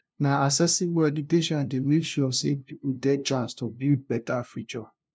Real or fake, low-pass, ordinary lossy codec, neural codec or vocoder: fake; none; none; codec, 16 kHz, 0.5 kbps, FunCodec, trained on LibriTTS, 25 frames a second